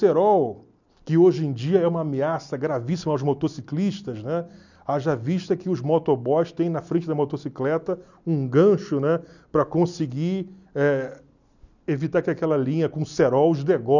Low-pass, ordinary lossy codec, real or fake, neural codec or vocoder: 7.2 kHz; none; real; none